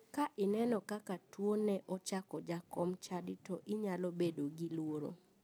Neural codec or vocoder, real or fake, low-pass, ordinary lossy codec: none; real; none; none